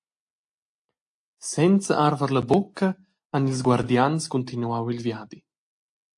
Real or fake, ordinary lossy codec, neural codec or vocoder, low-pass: real; AAC, 64 kbps; none; 10.8 kHz